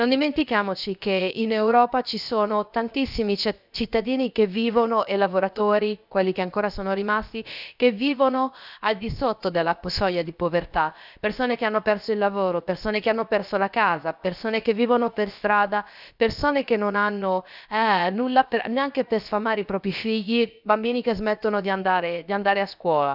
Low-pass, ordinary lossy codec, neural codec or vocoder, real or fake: 5.4 kHz; none; codec, 16 kHz, about 1 kbps, DyCAST, with the encoder's durations; fake